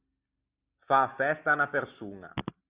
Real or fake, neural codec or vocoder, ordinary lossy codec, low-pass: real; none; Opus, 64 kbps; 3.6 kHz